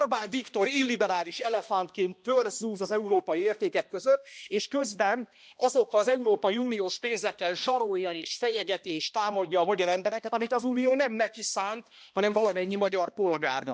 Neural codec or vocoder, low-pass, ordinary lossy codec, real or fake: codec, 16 kHz, 1 kbps, X-Codec, HuBERT features, trained on balanced general audio; none; none; fake